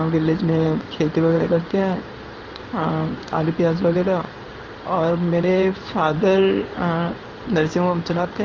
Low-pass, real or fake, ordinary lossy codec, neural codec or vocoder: 7.2 kHz; fake; Opus, 24 kbps; codec, 16 kHz in and 24 kHz out, 1 kbps, XY-Tokenizer